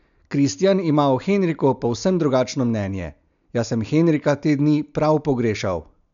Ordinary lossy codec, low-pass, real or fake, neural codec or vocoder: none; 7.2 kHz; real; none